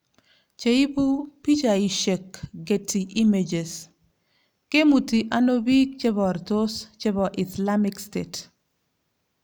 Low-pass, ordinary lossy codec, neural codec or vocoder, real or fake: none; none; none; real